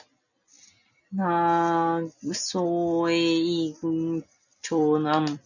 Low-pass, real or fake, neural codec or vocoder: 7.2 kHz; real; none